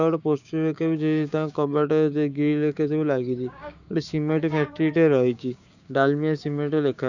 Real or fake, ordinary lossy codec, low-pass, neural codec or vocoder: fake; none; 7.2 kHz; codec, 16 kHz, 6 kbps, DAC